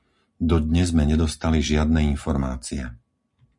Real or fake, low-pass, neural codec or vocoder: real; 10.8 kHz; none